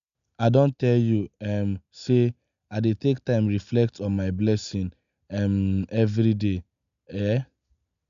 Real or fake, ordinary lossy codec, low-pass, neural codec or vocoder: real; AAC, 96 kbps; 7.2 kHz; none